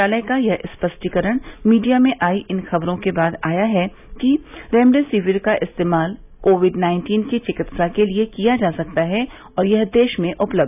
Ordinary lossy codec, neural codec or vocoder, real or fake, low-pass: none; none; real; 3.6 kHz